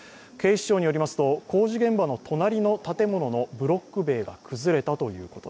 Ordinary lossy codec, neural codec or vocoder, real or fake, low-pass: none; none; real; none